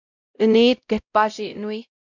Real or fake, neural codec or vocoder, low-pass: fake; codec, 16 kHz, 0.5 kbps, X-Codec, WavLM features, trained on Multilingual LibriSpeech; 7.2 kHz